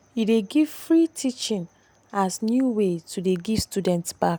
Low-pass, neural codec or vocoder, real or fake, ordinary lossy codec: none; none; real; none